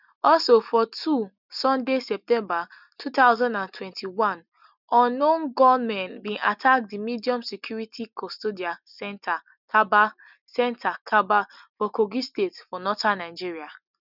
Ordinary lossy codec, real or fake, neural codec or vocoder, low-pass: none; real; none; 5.4 kHz